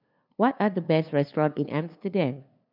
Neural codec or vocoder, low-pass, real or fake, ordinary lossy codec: codec, 16 kHz, 2 kbps, FunCodec, trained on LibriTTS, 25 frames a second; 5.4 kHz; fake; none